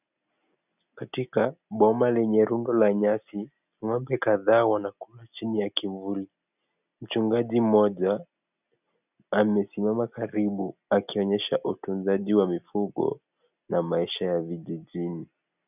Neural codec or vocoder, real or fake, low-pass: none; real; 3.6 kHz